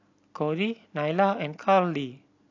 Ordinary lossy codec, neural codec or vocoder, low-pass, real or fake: AAC, 48 kbps; none; 7.2 kHz; real